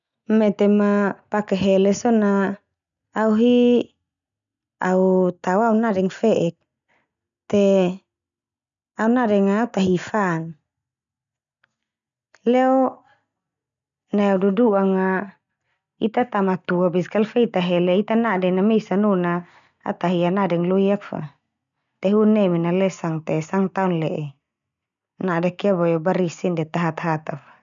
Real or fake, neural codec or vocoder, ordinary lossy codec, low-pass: real; none; none; 7.2 kHz